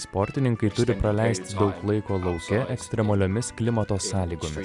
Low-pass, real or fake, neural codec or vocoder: 10.8 kHz; real; none